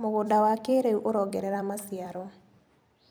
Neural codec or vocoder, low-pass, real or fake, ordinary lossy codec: vocoder, 44.1 kHz, 128 mel bands every 256 samples, BigVGAN v2; none; fake; none